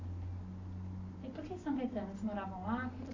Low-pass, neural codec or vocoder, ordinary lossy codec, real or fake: 7.2 kHz; none; AAC, 32 kbps; real